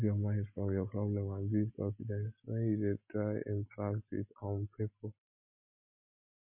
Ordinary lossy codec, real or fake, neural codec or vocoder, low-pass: MP3, 24 kbps; real; none; 3.6 kHz